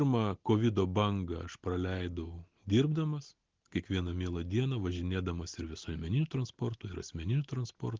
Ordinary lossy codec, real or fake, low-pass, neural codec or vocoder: Opus, 16 kbps; real; 7.2 kHz; none